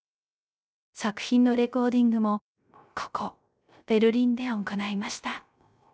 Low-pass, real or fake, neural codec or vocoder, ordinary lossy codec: none; fake; codec, 16 kHz, 0.3 kbps, FocalCodec; none